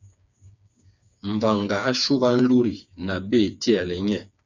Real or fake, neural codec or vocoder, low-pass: fake; codec, 16 kHz, 4 kbps, FreqCodec, smaller model; 7.2 kHz